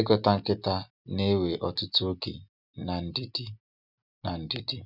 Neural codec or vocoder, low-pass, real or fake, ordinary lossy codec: none; 5.4 kHz; real; none